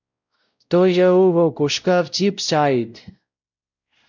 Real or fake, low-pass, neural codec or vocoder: fake; 7.2 kHz; codec, 16 kHz, 0.5 kbps, X-Codec, WavLM features, trained on Multilingual LibriSpeech